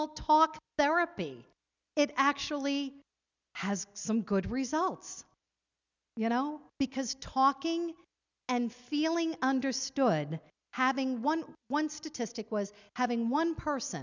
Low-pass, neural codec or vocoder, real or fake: 7.2 kHz; none; real